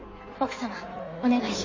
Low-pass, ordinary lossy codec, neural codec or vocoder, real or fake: 7.2 kHz; MP3, 32 kbps; codec, 16 kHz in and 24 kHz out, 1.1 kbps, FireRedTTS-2 codec; fake